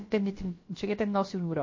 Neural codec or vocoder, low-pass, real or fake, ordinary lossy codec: codec, 16 kHz, about 1 kbps, DyCAST, with the encoder's durations; 7.2 kHz; fake; MP3, 32 kbps